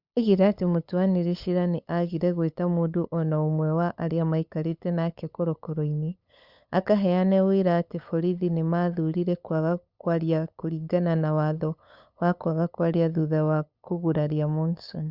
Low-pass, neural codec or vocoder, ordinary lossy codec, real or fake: 5.4 kHz; codec, 16 kHz, 8 kbps, FunCodec, trained on LibriTTS, 25 frames a second; Opus, 64 kbps; fake